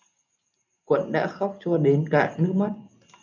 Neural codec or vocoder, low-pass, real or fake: none; 7.2 kHz; real